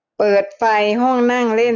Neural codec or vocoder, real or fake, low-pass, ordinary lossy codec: none; real; 7.2 kHz; none